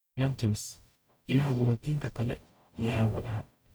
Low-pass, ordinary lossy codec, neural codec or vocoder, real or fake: none; none; codec, 44.1 kHz, 0.9 kbps, DAC; fake